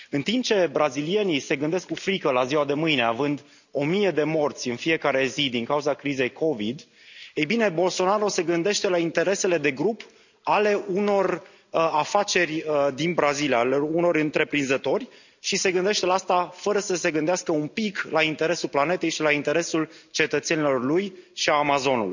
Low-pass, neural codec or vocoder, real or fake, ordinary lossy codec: 7.2 kHz; none; real; none